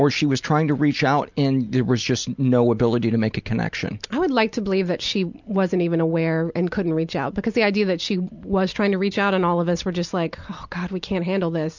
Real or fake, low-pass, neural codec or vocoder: real; 7.2 kHz; none